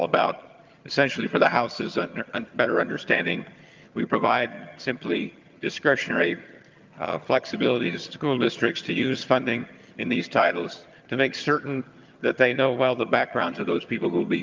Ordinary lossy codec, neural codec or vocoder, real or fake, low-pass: Opus, 24 kbps; vocoder, 22.05 kHz, 80 mel bands, HiFi-GAN; fake; 7.2 kHz